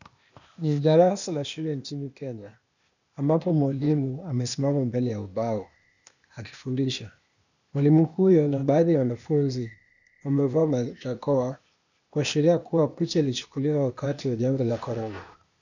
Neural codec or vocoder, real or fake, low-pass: codec, 16 kHz, 0.8 kbps, ZipCodec; fake; 7.2 kHz